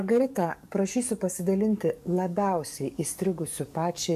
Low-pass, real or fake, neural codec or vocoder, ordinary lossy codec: 14.4 kHz; fake; codec, 44.1 kHz, 7.8 kbps, DAC; MP3, 96 kbps